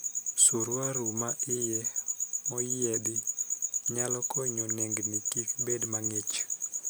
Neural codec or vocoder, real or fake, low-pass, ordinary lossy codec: none; real; none; none